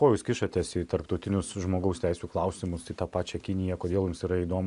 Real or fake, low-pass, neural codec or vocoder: real; 10.8 kHz; none